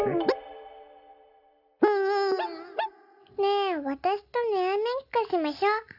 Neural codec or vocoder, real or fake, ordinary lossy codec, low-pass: none; real; none; 5.4 kHz